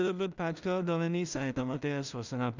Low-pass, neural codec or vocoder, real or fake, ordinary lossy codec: 7.2 kHz; codec, 16 kHz in and 24 kHz out, 0.4 kbps, LongCat-Audio-Codec, two codebook decoder; fake; none